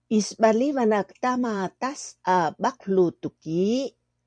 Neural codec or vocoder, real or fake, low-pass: vocoder, 44.1 kHz, 128 mel bands every 256 samples, BigVGAN v2; fake; 9.9 kHz